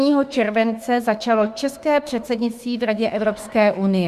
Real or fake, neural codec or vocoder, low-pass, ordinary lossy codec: fake; autoencoder, 48 kHz, 32 numbers a frame, DAC-VAE, trained on Japanese speech; 14.4 kHz; Opus, 64 kbps